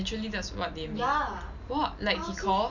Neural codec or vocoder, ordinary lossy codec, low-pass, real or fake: none; none; 7.2 kHz; real